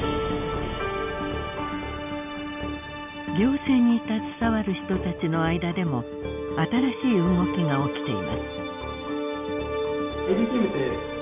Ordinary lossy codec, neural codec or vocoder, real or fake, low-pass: none; none; real; 3.6 kHz